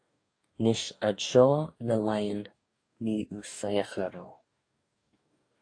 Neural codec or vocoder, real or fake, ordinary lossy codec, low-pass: codec, 44.1 kHz, 2.6 kbps, DAC; fake; AAC, 48 kbps; 9.9 kHz